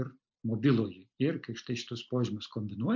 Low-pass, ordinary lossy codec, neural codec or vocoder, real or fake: 7.2 kHz; MP3, 64 kbps; none; real